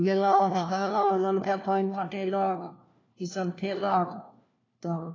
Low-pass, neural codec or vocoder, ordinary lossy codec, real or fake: 7.2 kHz; codec, 16 kHz, 1 kbps, FunCodec, trained on LibriTTS, 50 frames a second; AAC, 32 kbps; fake